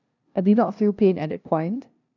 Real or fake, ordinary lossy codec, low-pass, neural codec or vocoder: fake; none; 7.2 kHz; codec, 16 kHz, 0.5 kbps, FunCodec, trained on LibriTTS, 25 frames a second